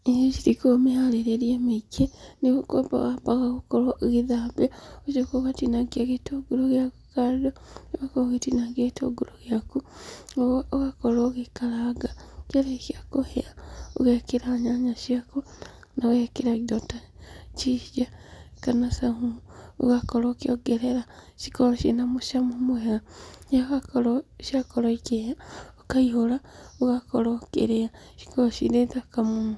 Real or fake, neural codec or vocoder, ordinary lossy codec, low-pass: real; none; none; none